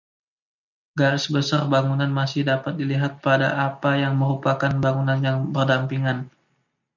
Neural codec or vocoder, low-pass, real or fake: none; 7.2 kHz; real